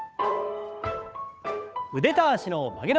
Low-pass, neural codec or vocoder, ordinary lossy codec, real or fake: none; codec, 16 kHz, 8 kbps, FunCodec, trained on Chinese and English, 25 frames a second; none; fake